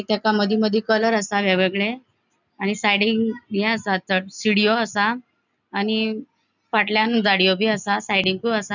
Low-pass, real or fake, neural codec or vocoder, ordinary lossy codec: 7.2 kHz; real; none; none